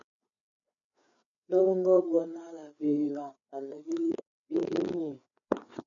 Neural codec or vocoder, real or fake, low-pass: codec, 16 kHz, 4 kbps, FreqCodec, larger model; fake; 7.2 kHz